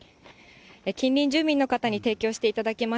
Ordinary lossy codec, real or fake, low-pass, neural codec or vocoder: none; real; none; none